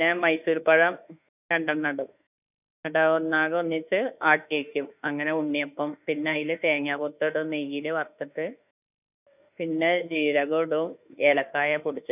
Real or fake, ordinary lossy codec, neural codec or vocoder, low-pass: fake; none; autoencoder, 48 kHz, 32 numbers a frame, DAC-VAE, trained on Japanese speech; 3.6 kHz